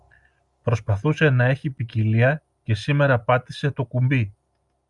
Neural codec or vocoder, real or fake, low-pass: none; real; 10.8 kHz